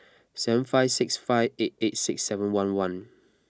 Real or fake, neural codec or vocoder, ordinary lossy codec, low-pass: real; none; none; none